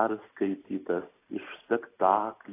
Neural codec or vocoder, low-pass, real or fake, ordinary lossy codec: none; 3.6 kHz; real; AAC, 16 kbps